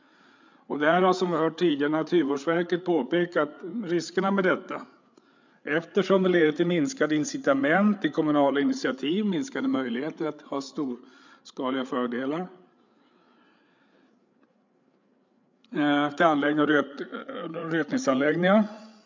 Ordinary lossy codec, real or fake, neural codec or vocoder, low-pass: MP3, 64 kbps; fake; codec, 16 kHz, 8 kbps, FreqCodec, larger model; 7.2 kHz